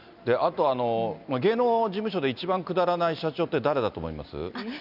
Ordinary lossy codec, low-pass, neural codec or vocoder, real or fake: none; 5.4 kHz; none; real